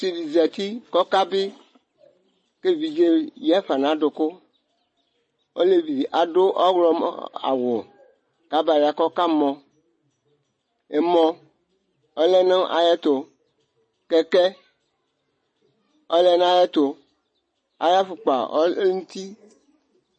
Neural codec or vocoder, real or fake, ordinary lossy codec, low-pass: none; real; MP3, 32 kbps; 9.9 kHz